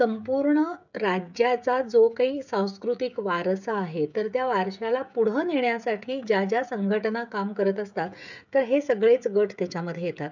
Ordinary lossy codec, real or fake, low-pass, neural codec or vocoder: none; fake; 7.2 kHz; codec, 16 kHz, 16 kbps, FreqCodec, smaller model